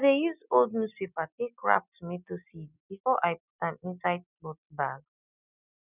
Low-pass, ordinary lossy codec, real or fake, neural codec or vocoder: 3.6 kHz; none; real; none